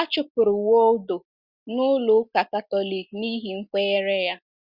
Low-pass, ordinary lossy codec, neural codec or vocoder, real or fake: 5.4 kHz; Opus, 64 kbps; none; real